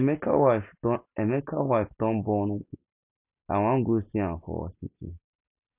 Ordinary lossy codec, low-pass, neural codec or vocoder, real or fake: none; 3.6 kHz; none; real